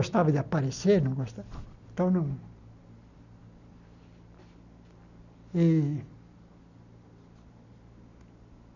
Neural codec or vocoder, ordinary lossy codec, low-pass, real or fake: none; none; 7.2 kHz; real